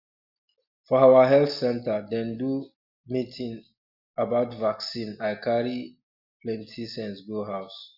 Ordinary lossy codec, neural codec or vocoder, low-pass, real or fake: none; none; 5.4 kHz; real